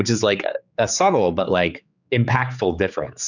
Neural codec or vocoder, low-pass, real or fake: codec, 16 kHz, 4 kbps, X-Codec, HuBERT features, trained on general audio; 7.2 kHz; fake